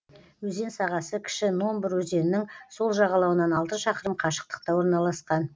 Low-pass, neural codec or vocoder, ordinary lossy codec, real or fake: none; none; none; real